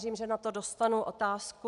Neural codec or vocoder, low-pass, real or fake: none; 10.8 kHz; real